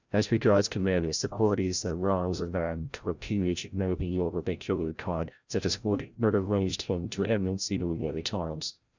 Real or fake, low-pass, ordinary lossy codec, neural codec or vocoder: fake; 7.2 kHz; Opus, 64 kbps; codec, 16 kHz, 0.5 kbps, FreqCodec, larger model